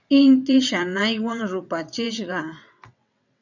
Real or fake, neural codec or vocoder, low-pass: fake; vocoder, 22.05 kHz, 80 mel bands, WaveNeXt; 7.2 kHz